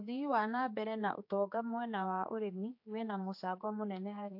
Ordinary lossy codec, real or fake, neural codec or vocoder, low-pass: AAC, 48 kbps; fake; codec, 16 kHz, 4 kbps, X-Codec, HuBERT features, trained on general audio; 5.4 kHz